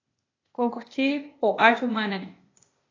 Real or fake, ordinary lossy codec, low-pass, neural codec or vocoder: fake; AAC, 32 kbps; 7.2 kHz; codec, 16 kHz, 0.8 kbps, ZipCodec